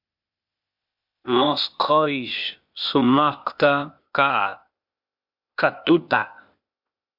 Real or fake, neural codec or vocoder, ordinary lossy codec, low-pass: fake; codec, 16 kHz, 0.8 kbps, ZipCodec; MP3, 48 kbps; 5.4 kHz